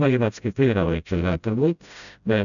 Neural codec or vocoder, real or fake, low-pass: codec, 16 kHz, 0.5 kbps, FreqCodec, smaller model; fake; 7.2 kHz